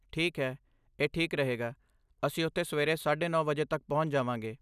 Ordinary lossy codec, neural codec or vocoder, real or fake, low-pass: none; none; real; 14.4 kHz